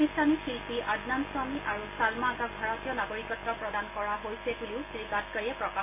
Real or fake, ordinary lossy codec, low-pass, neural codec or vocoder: real; MP3, 24 kbps; 3.6 kHz; none